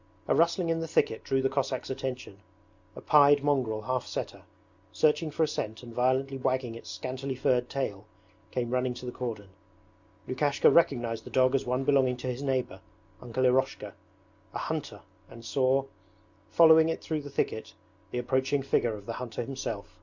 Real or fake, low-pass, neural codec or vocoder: real; 7.2 kHz; none